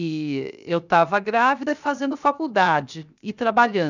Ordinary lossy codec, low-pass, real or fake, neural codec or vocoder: none; 7.2 kHz; fake; codec, 16 kHz, 0.7 kbps, FocalCodec